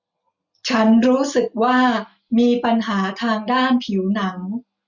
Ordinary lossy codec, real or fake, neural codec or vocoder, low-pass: none; real; none; 7.2 kHz